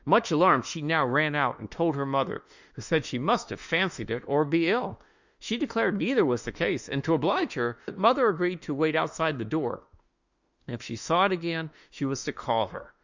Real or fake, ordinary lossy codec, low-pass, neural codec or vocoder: fake; Opus, 64 kbps; 7.2 kHz; autoencoder, 48 kHz, 32 numbers a frame, DAC-VAE, trained on Japanese speech